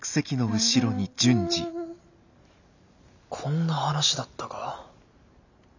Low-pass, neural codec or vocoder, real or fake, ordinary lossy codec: 7.2 kHz; none; real; none